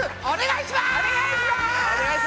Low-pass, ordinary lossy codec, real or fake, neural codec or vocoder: none; none; real; none